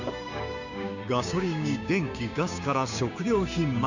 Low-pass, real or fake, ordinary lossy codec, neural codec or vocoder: 7.2 kHz; fake; none; codec, 16 kHz, 6 kbps, DAC